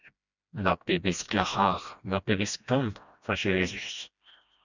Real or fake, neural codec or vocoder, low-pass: fake; codec, 16 kHz, 1 kbps, FreqCodec, smaller model; 7.2 kHz